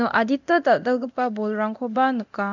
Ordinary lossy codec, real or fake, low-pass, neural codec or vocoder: none; fake; 7.2 kHz; codec, 24 kHz, 0.9 kbps, DualCodec